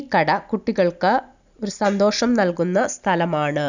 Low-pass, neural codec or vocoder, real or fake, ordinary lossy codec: 7.2 kHz; none; real; none